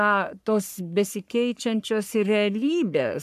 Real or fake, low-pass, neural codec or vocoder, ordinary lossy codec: fake; 14.4 kHz; codec, 44.1 kHz, 3.4 kbps, Pupu-Codec; AAC, 96 kbps